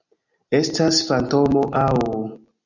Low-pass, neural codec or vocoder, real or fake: 7.2 kHz; none; real